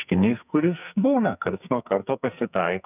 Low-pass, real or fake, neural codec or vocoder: 3.6 kHz; fake; codec, 44.1 kHz, 2.6 kbps, SNAC